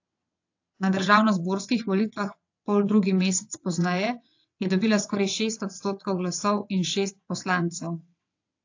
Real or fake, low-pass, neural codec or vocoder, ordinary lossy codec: fake; 7.2 kHz; vocoder, 22.05 kHz, 80 mel bands, WaveNeXt; AAC, 48 kbps